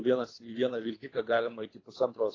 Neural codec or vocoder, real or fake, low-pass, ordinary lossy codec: codec, 24 kHz, 3 kbps, HILCodec; fake; 7.2 kHz; AAC, 32 kbps